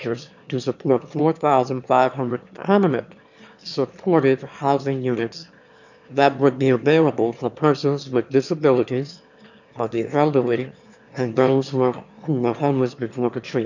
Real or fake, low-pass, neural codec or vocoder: fake; 7.2 kHz; autoencoder, 22.05 kHz, a latent of 192 numbers a frame, VITS, trained on one speaker